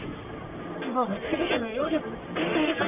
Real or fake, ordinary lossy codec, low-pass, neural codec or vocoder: fake; none; 3.6 kHz; codec, 44.1 kHz, 1.7 kbps, Pupu-Codec